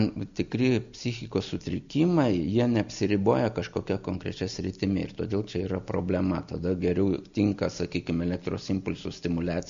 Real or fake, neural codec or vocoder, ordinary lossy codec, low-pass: real; none; MP3, 64 kbps; 7.2 kHz